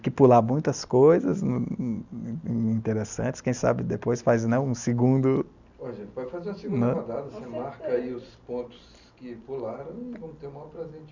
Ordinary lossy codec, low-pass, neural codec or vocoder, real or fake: none; 7.2 kHz; none; real